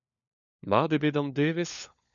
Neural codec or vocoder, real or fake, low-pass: codec, 16 kHz, 4 kbps, FunCodec, trained on LibriTTS, 50 frames a second; fake; 7.2 kHz